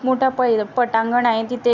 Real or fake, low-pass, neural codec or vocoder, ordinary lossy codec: real; 7.2 kHz; none; none